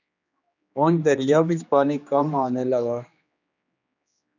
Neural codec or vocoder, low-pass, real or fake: codec, 16 kHz, 2 kbps, X-Codec, HuBERT features, trained on general audio; 7.2 kHz; fake